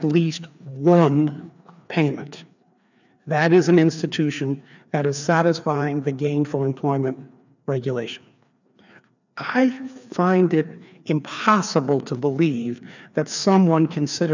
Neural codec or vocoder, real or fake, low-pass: codec, 16 kHz, 2 kbps, FreqCodec, larger model; fake; 7.2 kHz